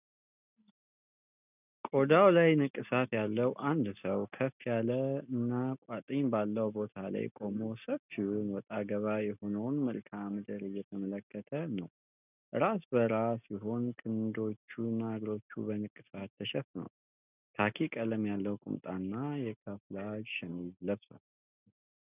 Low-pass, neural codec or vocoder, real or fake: 3.6 kHz; none; real